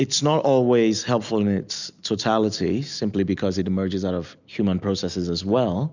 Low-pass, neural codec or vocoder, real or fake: 7.2 kHz; none; real